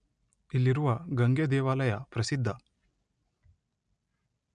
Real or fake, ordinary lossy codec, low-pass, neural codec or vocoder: real; none; 9.9 kHz; none